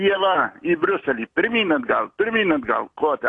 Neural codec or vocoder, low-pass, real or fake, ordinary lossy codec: none; 10.8 kHz; real; AAC, 48 kbps